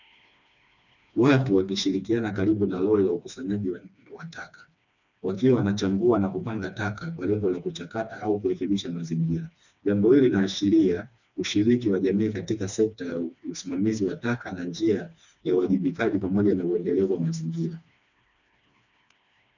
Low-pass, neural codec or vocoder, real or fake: 7.2 kHz; codec, 16 kHz, 2 kbps, FreqCodec, smaller model; fake